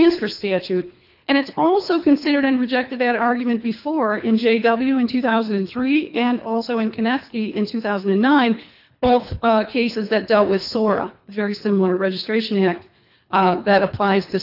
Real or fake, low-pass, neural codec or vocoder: fake; 5.4 kHz; codec, 24 kHz, 3 kbps, HILCodec